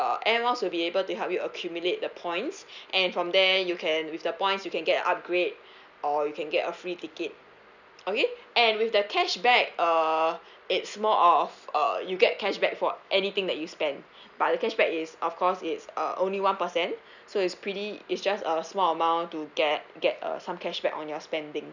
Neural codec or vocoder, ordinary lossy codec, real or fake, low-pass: none; none; real; 7.2 kHz